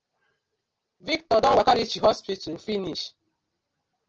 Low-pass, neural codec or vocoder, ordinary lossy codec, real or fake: 7.2 kHz; none; Opus, 24 kbps; real